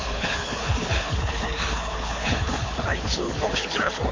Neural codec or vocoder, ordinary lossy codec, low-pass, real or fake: codec, 24 kHz, 6 kbps, HILCodec; AAC, 32 kbps; 7.2 kHz; fake